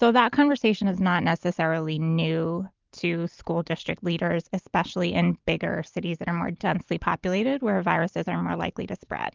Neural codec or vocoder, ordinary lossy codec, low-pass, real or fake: none; Opus, 24 kbps; 7.2 kHz; real